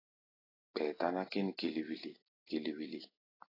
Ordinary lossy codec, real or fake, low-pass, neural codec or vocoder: AAC, 24 kbps; real; 5.4 kHz; none